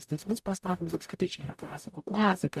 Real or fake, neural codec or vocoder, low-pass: fake; codec, 44.1 kHz, 0.9 kbps, DAC; 14.4 kHz